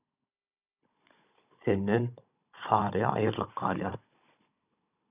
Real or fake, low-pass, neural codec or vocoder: fake; 3.6 kHz; codec, 16 kHz, 16 kbps, FunCodec, trained on Chinese and English, 50 frames a second